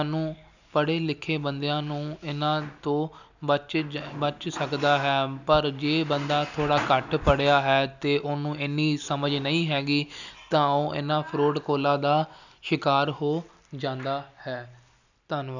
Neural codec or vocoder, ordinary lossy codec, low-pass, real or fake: none; none; 7.2 kHz; real